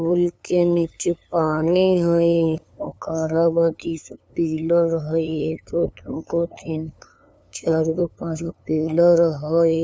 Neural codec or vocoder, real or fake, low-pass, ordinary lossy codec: codec, 16 kHz, 2 kbps, FunCodec, trained on LibriTTS, 25 frames a second; fake; none; none